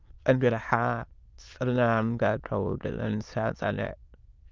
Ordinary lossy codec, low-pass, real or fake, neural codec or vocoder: Opus, 24 kbps; 7.2 kHz; fake; autoencoder, 22.05 kHz, a latent of 192 numbers a frame, VITS, trained on many speakers